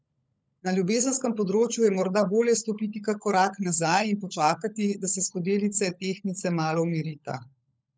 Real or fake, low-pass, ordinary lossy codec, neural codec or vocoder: fake; none; none; codec, 16 kHz, 16 kbps, FunCodec, trained on LibriTTS, 50 frames a second